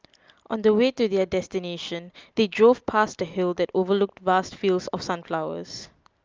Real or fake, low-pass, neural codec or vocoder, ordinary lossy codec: real; 7.2 kHz; none; Opus, 24 kbps